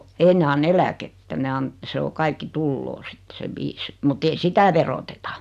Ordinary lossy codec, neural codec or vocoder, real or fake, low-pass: none; none; real; 14.4 kHz